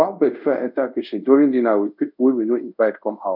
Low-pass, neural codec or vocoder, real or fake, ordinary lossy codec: 5.4 kHz; codec, 24 kHz, 0.5 kbps, DualCodec; fake; none